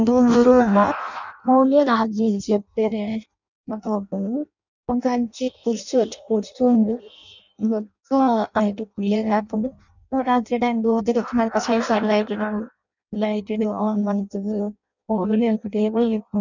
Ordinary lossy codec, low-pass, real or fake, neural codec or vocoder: none; 7.2 kHz; fake; codec, 16 kHz in and 24 kHz out, 0.6 kbps, FireRedTTS-2 codec